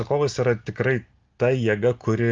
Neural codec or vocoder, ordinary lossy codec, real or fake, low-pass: none; Opus, 32 kbps; real; 7.2 kHz